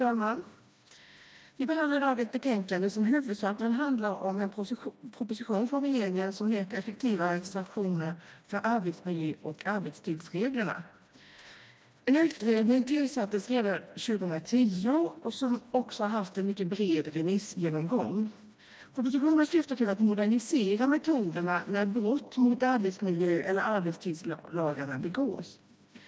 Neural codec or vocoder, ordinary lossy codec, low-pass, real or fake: codec, 16 kHz, 1 kbps, FreqCodec, smaller model; none; none; fake